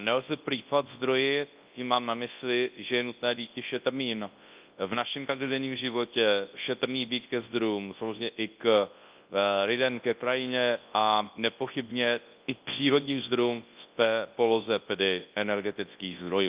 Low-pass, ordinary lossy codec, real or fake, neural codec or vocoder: 3.6 kHz; Opus, 32 kbps; fake; codec, 24 kHz, 0.9 kbps, WavTokenizer, large speech release